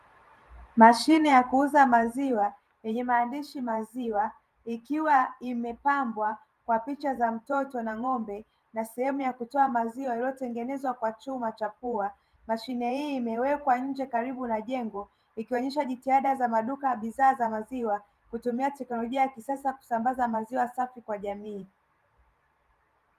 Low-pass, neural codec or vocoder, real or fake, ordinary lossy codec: 14.4 kHz; vocoder, 44.1 kHz, 128 mel bands every 512 samples, BigVGAN v2; fake; Opus, 32 kbps